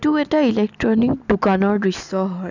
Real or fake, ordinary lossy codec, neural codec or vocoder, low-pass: real; none; none; 7.2 kHz